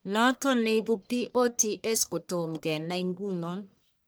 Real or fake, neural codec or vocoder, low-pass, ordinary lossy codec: fake; codec, 44.1 kHz, 1.7 kbps, Pupu-Codec; none; none